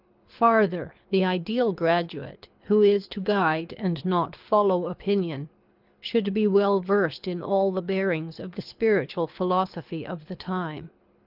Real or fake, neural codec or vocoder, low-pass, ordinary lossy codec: fake; codec, 24 kHz, 6 kbps, HILCodec; 5.4 kHz; Opus, 24 kbps